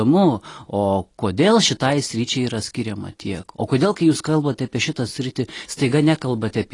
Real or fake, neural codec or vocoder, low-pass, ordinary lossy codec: real; none; 10.8 kHz; AAC, 32 kbps